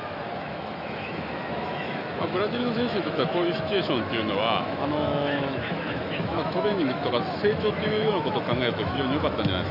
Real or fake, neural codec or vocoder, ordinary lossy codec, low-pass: fake; autoencoder, 48 kHz, 128 numbers a frame, DAC-VAE, trained on Japanese speech; none; 5.4 kHz